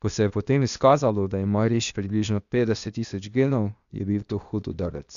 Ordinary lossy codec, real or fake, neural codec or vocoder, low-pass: none; fake; codec, 16 kHz, 0.8 kbps, ZipCodec; 7.2 kHz